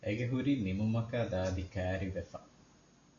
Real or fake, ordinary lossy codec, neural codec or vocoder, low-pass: real; AAC, 48 kbps; none; 7.2 kHz